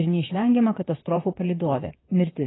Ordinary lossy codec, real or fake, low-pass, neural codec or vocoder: AAC, 16 kbps; fake; 7.2 kHz; vocoder, 44.1 kHz, 128 mel bands, Pupu-Vocoder